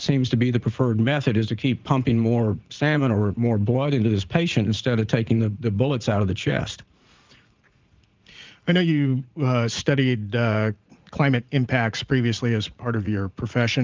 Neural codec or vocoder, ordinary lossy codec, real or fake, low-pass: vocoder, 44.1 kHz, 80 mel bands, Vocos; Opus, 24 kbps; fake; 7.2 kHz